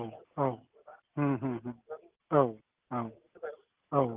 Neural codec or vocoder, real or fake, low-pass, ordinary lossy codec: none; real; 3.6 kHz; Opus, 32 kbps